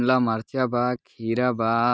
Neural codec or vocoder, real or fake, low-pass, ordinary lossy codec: none; real; none; none